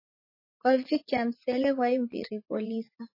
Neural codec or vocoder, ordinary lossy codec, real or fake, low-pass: vocoder, 44.1 kHz, 80 mel bands, Vocos; MP3, 24 kbps; fake; 5.4 kHz